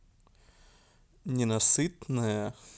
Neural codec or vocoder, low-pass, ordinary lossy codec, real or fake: none; none; none; real